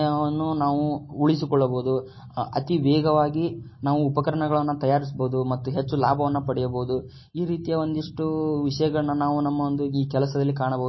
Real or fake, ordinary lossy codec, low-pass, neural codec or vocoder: real; MP3, 24 kbps; 7.2 kHz; none